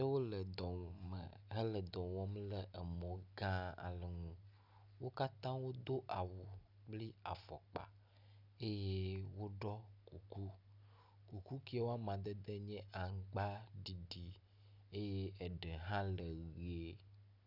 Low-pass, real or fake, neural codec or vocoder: 5.4 kHz; real; none